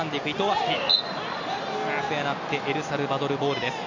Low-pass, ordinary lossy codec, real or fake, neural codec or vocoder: 7.2 kHz; none; real; none